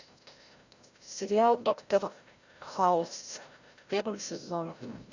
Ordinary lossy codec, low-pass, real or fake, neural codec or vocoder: Opus, 64 kbps; 7.2 kHz; fake; codec, 16 kHz, 0.5 kbps, FreqCodec, larger model